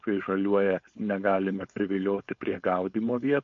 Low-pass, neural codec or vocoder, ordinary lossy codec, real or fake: 7.2 kHz; codec, 16 kHz, 4.8 kbps, FACodec; MP3, 48 kbps; fake